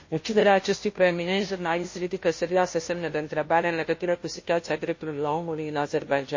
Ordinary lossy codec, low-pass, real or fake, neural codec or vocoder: MP3, 32 kbps; 7.2 kHz; fake; codec, 16 kHz, 0.5 kbps, FunCodec, trained on Chinese and English, 25 frames a second